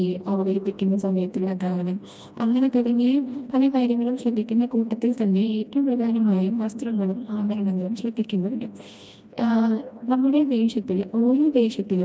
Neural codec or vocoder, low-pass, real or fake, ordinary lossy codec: codec, 16 kHz, 1 kbps, FreqCodec, smaller model; none; fake; none